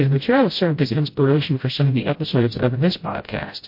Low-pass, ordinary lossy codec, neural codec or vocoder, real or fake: 5.4 kHz; MP3, 32 kbps; codec, 16 kHz, 0.5 kbps, FreqCodec, smaller model; fake